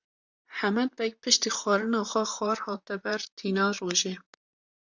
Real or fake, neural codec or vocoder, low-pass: fake; vocoder, 44.1 kHz, 128 mel bands, Pupu-Vocoder; 7.2 kHz